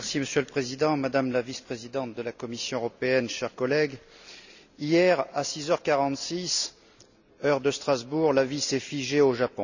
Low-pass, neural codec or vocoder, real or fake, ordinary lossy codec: 7.2 kHz; none; real; none